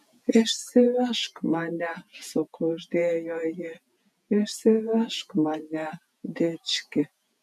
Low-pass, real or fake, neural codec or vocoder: 14.4 kHz; fake; vocoder, 48 kHz, 128 mel bands, Vocos